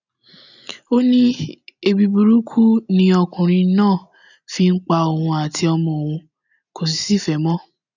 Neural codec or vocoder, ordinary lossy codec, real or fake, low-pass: none; none; real; 7.2 kHz